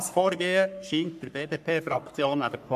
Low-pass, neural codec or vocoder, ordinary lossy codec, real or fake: 14.4 kHz; codec, 44.1 kHz, 3.4 kbps, Pupu-Codec; AAC, 96 kbps; fake